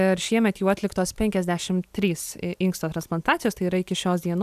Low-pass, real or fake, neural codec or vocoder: 14.4 kHz; real; none